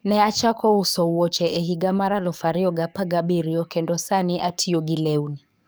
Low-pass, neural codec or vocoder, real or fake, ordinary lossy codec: none; codec, 44.1 kHz, 7.8 kbps, DAC; fake; none